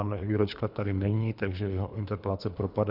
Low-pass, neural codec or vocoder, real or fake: 5.4 kHz; codec, 24 kHz, 3 kbps, HILCodec; fake